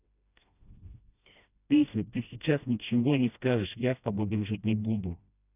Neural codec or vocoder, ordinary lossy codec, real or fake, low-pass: codec, 16 kHz, 1 kbps, FreqCodec, smaller model; none; fake; 3.6 kHz